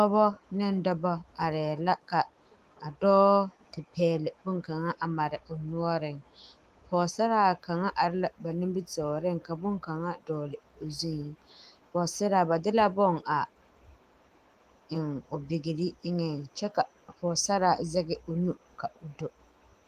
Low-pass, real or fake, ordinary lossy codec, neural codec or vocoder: 14.4 kHz; fake; Opus, 24 kbps; autoencoder, 48 kHz, 128 numbers a frame, DAC-VAE, trained on Japanese speech